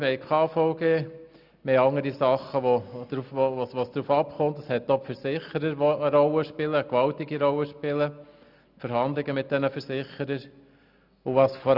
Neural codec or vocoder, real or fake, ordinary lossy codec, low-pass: none; real; none; 5.4 kHz